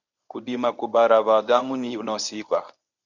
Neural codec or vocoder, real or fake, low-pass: codec, 24 kHz, 0.9 kbps, WavTokenizer, medium speech release version 1; fake; 7.2 kHz